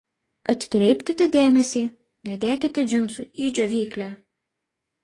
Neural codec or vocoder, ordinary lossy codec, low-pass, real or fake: codec, 44.1 kHz, 2.6 kbps, DAC; AAC, 32 kbps; 10.8 kHz; fake